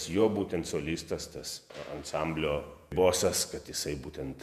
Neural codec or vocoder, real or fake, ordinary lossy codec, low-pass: vocoder, 48 kHz, 128 mel bands, Vocos; fake; MP3, 96 kbps; 14.4 kHz